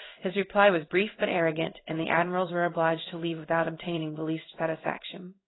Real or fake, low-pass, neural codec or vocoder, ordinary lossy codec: fake; 7.2 kHz; codec, 16 kHz in and 24 kHz out, 1 kbps, XY-Tokenizer; AAC, 16 kbps